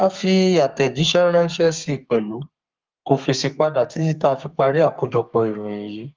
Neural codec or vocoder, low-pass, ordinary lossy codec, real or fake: codec, 32 kHz, 1.9 kbps, SNAC; 7.2 kHz; Opus, 32 kbps; fake